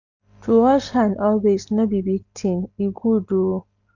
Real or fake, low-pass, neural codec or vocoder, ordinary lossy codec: real; 7.2 kHz; none; none